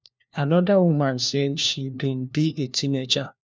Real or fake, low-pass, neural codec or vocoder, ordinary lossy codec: fake; none; codec, 16 kHz, 1 kbps, FunCodec, trained on LibriTTS, 50 frames a second; none